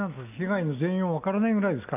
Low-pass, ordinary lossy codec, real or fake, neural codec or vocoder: 3.6 kHz; none; real; none